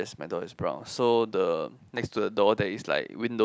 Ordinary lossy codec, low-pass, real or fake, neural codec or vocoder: none; none; real; none